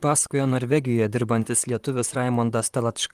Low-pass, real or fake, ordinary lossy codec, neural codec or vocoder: 14.4 kHz; fake; Opus, 32 kbps; vocoder, 44.1 kHz, 128 mel bands, Pupu-Vocoder